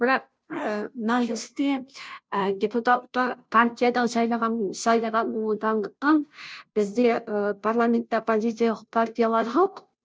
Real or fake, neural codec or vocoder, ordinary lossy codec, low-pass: fake; codec, 16 kHz, 0.5 kbps, FunCodec, trained on Chinese and English, 25 frames a second; none; none